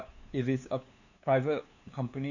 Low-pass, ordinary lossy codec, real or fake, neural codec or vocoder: 7.2 kHz; none; fake; codec, 16 kHz, 2 kbps, FunCodec, trained on LibriTTS, 25 frames a second